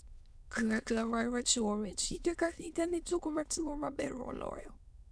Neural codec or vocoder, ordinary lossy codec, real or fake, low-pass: autoencoder, 22.05 kHz, a latent of 192 numbers a frame, VITS, trained on many speakers; none; fake; none